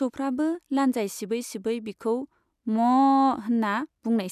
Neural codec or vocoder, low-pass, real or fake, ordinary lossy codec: none; 14.4 kHz; real; none